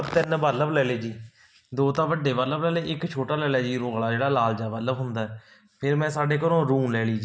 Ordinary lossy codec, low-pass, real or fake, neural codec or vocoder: none; none; real; none